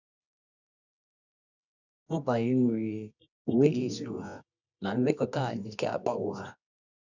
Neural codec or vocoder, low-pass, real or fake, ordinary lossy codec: codec, 24 kHz, 0.9 kbps, WavTokenizer, medium music audio release; 7.2 kHz; fake; none